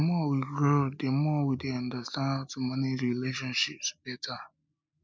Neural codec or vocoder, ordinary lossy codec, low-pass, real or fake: none; none; none; real